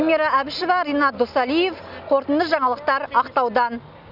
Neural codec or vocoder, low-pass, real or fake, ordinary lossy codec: none; 5.4 kHz; real; none